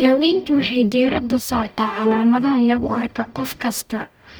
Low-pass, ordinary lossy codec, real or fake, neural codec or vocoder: none; none; fake; codec, 44.1 kHz, 0.9 kbps, DAC